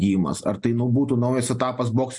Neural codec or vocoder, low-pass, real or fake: none; 10.8 kHz; real